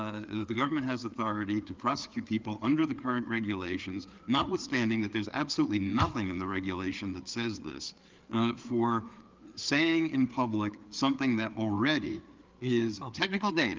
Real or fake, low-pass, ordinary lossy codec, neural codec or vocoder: fake; 7.2 kHz; Opus, 32 kbps; codec, 16 kHz, 4 kbps, FreqCodec, larger model